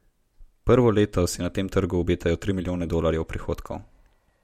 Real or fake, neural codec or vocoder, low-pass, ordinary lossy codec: real; none; 19.8 kHz; MP3, 64 kbps